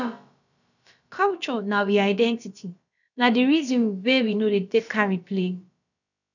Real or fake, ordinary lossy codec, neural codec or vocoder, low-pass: fake; none; codec, 16 kHz, about 1 kbps, DyCAST, with the encoder's durations; 7.2 kHz